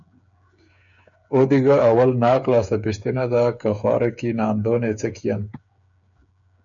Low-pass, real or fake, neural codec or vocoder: 7.2 kHz; fake; codec, 16 kHz, 8 kbps, FreqCodec, smaller model